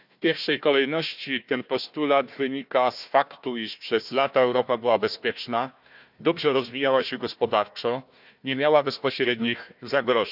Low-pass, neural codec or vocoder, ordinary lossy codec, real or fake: 5.4 kHz; codec, 16 kHz, 1 kbps, FunCodec, trained on Chinese and English, 50 frames a second; none; fake